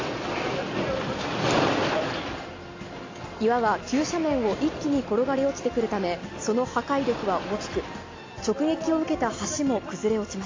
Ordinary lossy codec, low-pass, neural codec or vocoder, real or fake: AAC, 32 kbps; 7.2 kHz; none; real